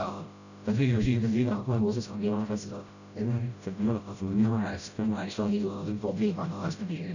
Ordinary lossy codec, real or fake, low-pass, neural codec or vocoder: none; fake; 7.2 kHz; codec, 16 kHz, 0.5 kbps, FreqCodec, smaller model